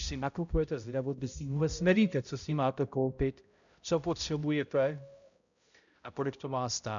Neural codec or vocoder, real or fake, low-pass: codec, 16 kHz, 0.5 kbps, X-Codec, HuBERT features, trained on balanced general audio; fake; 7.2 kHz